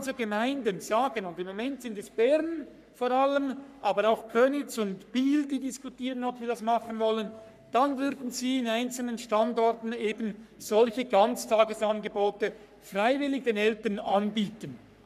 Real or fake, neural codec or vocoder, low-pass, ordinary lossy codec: fake; codec, 44.1 kHz, 3.4 kbps, Pupu-Codec; 14.4 kHz; none